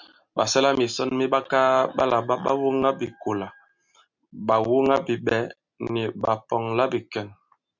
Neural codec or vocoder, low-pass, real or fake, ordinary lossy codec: none; 7.2 kHz; real; MP3, 64 kbps